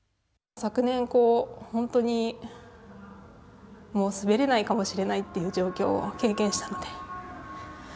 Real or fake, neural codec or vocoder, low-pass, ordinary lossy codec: real; none; none; none